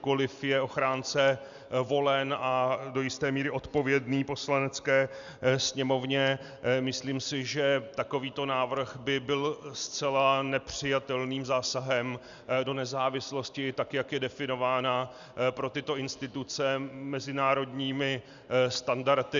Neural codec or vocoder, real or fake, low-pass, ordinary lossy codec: none; real; 7.2 kHz; Opus, 64 kbps